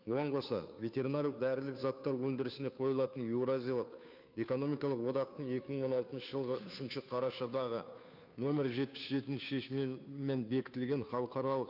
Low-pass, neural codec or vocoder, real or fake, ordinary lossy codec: 5.4 kHz; codec, 16 kHz, 2 kbps, FunCodec, trained on Chinese and English, 25 frames a second; fake; none